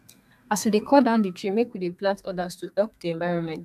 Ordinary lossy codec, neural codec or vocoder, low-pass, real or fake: AAC, 96 kbps; codec, 32 kHz, 1.9 kbps, SNAC; 14.4 kHz; fake